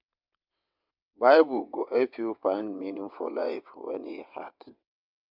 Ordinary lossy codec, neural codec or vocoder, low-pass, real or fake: MP3, 48 kbps; vocoder, 22.05 kHz, 80 mel bands, WaveNeXt; 5.4 kHz; fake